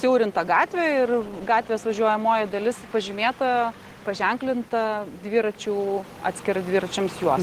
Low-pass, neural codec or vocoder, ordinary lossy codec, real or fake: 14.4 kHz; none; Opus, 16 kbps; real